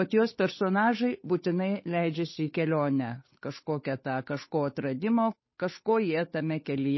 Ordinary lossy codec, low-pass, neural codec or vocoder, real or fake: MP3, 24 kbps; 7.2 kHz; codec, 16 kHz, 8 kbps, FunCodec, trained on Chinese and English, 25 frames a second; fake